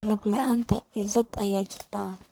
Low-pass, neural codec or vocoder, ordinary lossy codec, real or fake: none; codec, 44.1 kHz, 1.7 kbps, Pupu-Codec; none; fake